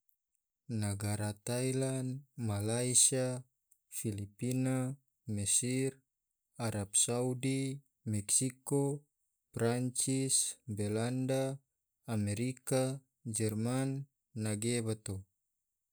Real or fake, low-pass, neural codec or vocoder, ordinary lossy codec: real; none; none; none